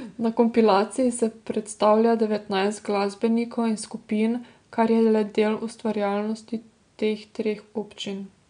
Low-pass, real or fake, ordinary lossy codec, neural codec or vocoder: 9.9 kHz; real; MP3, 64 kbps; none